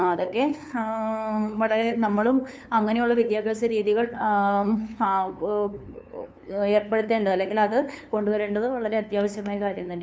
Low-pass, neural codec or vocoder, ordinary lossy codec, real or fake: none; codec, 16 kHz, 2 kbps, FunCodec, trained on LibriTTS, 25 frames a second; none; fake